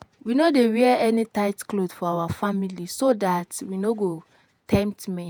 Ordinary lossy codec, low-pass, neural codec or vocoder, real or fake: none; none; vocoder, 48 kHz, 128 mel bands, Vocos; fake